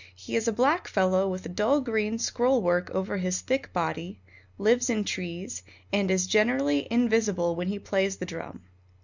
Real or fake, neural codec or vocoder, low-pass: real; none; 7.2 kHz